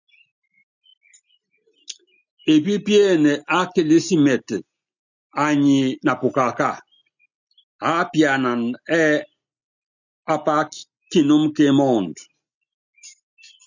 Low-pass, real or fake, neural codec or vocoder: 7.2 kHz; real; none